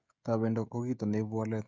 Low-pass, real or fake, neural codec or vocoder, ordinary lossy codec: none; fake; codec, 16 kHz, 16 kbps, FreqCodec, smaller model; none